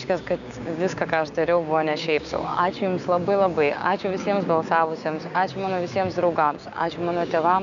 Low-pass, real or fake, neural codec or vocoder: 7.2 kHz; fake; codec, 16 kHz, 6 kbps, DAC